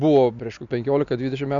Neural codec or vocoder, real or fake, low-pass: none; real; 7.2 kHz